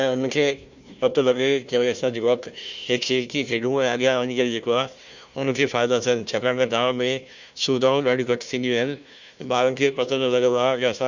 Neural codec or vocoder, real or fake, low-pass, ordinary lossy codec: codec, 16 kHz, 1 kbps, FunCodec, trained on Chinese and English, 50 frames a second; fake; 7.2 kHz; none